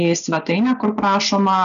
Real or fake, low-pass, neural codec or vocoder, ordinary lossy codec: real; 7.2 kHz; none; AAC, 96 kbps